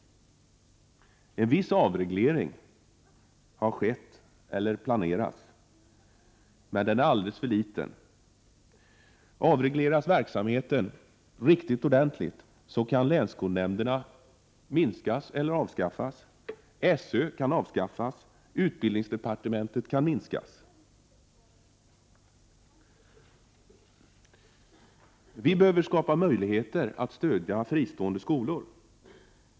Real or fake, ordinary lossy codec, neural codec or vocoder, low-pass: real; none; none; none